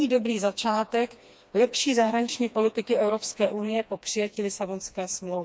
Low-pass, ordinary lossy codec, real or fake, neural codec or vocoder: none; none; fake; codec, 16 kHz, 2 kbps, FreqCodec, smaller model